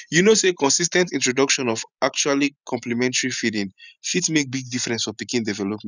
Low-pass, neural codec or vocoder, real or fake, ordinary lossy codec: 7.2 kHz; vocoder, 44.1 kHz, 128 mel bands every 512 samples, BigVGAN v2; fake; none